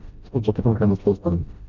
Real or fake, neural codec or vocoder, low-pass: fake; codec, 16 kHz, 0.5 kbps, FreqCodec, smaller model; 7.2 kHz